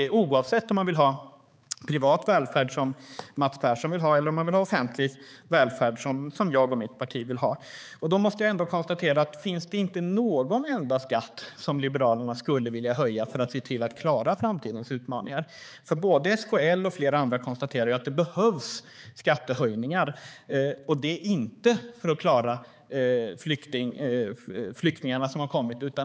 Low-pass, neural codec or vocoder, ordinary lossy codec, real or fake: none; codec, 16 kHz, 4 kbps, X-Codec, HuBERT features, trained on balanced general audio; none; fake